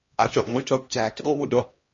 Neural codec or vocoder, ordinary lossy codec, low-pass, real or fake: codec, 16 kHz, 1 kbps, X-Codec, HuBERT features, trained on LibriSpeech; MP3, 32 kbps; 7.2 kHz; fake